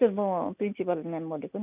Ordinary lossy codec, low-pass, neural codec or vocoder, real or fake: none; 3.6 kHz; none; real